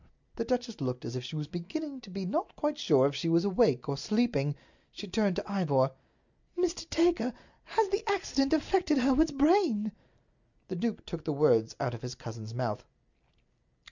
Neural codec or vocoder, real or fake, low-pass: none; real; 7.2 kHz